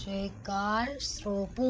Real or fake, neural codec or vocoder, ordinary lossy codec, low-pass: fake; codec, 16 kHz, 16 kbps, FreqCodec, larger model; none; none